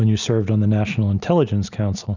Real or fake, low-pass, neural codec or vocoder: real; 7.2 kHz; none